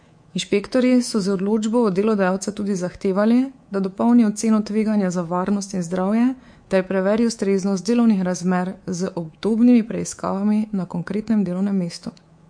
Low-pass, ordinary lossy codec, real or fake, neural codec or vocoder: 9.9 kHz; MP3, 48 kbps; fake; codec, 24 kHz, 3.1 kbps, DualCodec